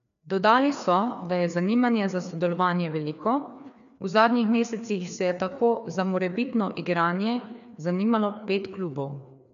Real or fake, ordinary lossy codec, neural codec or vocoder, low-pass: fake; none; codec, 16 kHz, 2 kbps, FreqCodec, larger model; 7.2 kHz